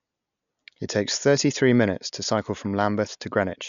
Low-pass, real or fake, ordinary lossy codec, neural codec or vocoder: 7.2 kHz; real; none; none